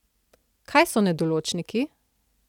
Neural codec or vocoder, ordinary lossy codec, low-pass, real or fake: none; none; 19.8 kHz; real